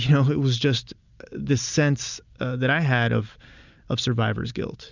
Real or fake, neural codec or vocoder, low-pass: real; none; 7.2 kHz